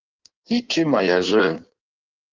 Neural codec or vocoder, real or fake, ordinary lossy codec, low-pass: codec, 16 kHz in and 24 kHz out, 1.1 kbps, FireRedTTS-2 codec; fake; Opus, 32 kbps; 7.2 kHz